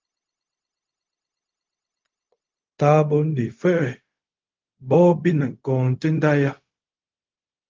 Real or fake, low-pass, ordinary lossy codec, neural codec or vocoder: fake; 7.2 kHz; Opus, 32 kbps; codec, 16 kHz, 0.4 kbps, LongCat-Audio-Codec